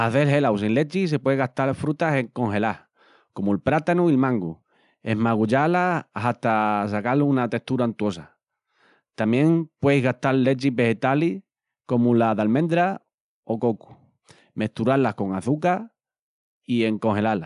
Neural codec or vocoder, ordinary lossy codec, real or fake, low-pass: none; none; real; 10.8 kHz